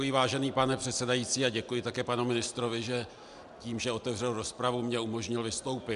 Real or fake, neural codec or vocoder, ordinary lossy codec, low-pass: real; none; AAC, 96 kbps; 10.8 kHz